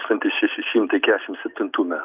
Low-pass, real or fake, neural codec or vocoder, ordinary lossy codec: 3.6 kHz; real; none; Opus, 24 kbps